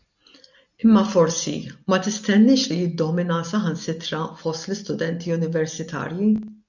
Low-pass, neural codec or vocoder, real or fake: 7.2 kHz; none; real